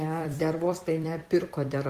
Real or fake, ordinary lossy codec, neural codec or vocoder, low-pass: fake; Opus, 24 kbps; vocoder, 44.1 kHz, 128 mel bands every 256 samples, BigVGAN v2; 14.4 kHz